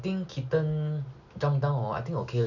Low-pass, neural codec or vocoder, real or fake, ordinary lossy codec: 7.2 kHz; none; real; none